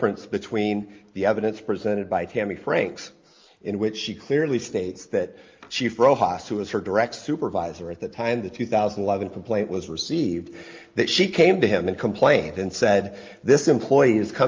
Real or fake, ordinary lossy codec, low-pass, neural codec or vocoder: real; Opus, 24 kbps; 7.2 kHz; none